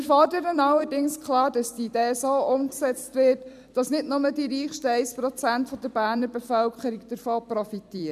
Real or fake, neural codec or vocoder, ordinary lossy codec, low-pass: fake; vocoder, 44.1 kHz, 128 mel bands every 256 samples, BigVGAN v2; MP3, 96 kbps; 14.4 kHz